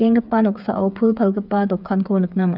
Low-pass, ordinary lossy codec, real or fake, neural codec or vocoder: 5.4 kHz; none; fake; codec, 16 kHz, 8 kbps, FreqCodec, smaller model